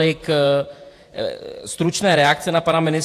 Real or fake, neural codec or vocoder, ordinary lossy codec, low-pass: fake; vocoder, 48 kHz, 128 mel bands, Vocos; AAC, 64 kbps; 14.4 kHz